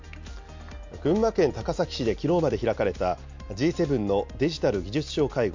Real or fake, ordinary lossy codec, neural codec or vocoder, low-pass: real; MP3, 48 kbps; none; 7.2 kHz